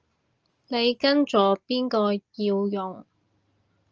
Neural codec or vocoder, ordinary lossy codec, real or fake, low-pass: none; Opus, 24 kbps; real; 7.2 kHz